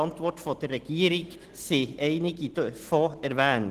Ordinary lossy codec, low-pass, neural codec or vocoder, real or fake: Opus, 16 kbps; 14.4 kHz; none; real